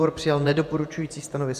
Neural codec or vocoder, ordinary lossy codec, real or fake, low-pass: vocoder, 48 kHz, 128 mel bands, Vocos; MP3, 96 kbps; fake; 14.4 kHz